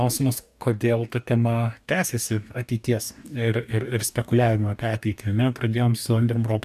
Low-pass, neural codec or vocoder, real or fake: 14.4 kHz; codec, 44.1 kHz, 2.6 kbps, DAC; fake